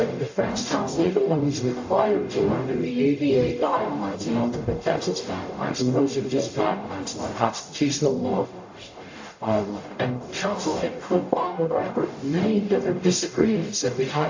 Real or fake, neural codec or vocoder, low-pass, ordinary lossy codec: fake; codec, 44.1 kHz, 0.9 kbps, DAC; 7.2 kHz; AAC, 48 kbps